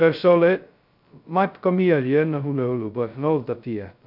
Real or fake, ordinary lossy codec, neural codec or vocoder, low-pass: fake; none; codec, 16 kHz, 0.2 kbps, FocalCodec; 5.4 kHz